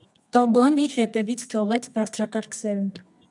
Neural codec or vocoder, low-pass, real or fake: codec, 24 kHz, 0.9 kbps, WavTokenizer, medium music audio release; 10.8 kHz; fake